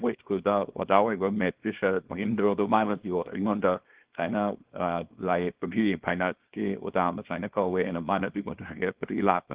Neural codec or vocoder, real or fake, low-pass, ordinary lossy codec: codec, 24 kHz, 0.9 kbps, WavTokenizer, small release; fake; 3.6 kHz; Opus, 16 kbps